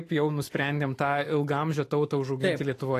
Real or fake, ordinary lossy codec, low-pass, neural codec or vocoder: fake; AAC, 48 kbps; 14.4 kHz; autoencoder, 48 kHz, 128 numbers a frame, DAC-VAE, trained on Japanese speech